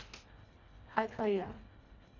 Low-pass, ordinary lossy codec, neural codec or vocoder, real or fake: 7.2 kHz; none; codec, 24 kHz, 1.5 kbps, HILCodec; fake